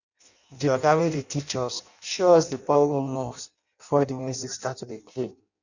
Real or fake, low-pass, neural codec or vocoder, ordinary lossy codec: fake; 7.2 kHz; codec, 16 kHz in and 24 kHz out, 0.6 kbps, FireRedTTS-2 codec; none